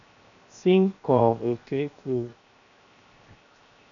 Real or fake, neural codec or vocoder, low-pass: fake; codec, 16 kHz, 0.7 kbps, FocalCodec; 7.2 kHz